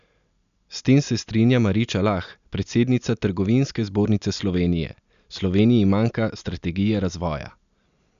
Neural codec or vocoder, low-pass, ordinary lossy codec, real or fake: none; 7.2 kHz; none; real